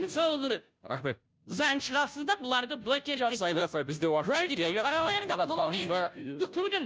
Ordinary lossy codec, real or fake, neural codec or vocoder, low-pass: none; fake; codec, 16 kHz, 0.5 kbps, FunCodec, trained on Chinese and English, 25 frames a second; none